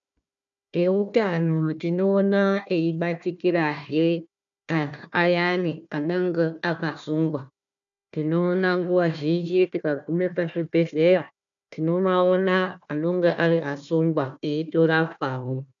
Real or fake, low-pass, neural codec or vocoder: fake; 7.2 kHz; codec, 16 kHz, 1 kbps, FunCodec, trained on Chinese and English, 50 frames a second